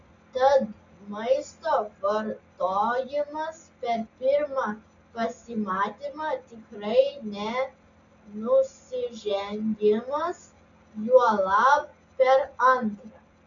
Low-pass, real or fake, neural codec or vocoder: 7.2 kHz; real; none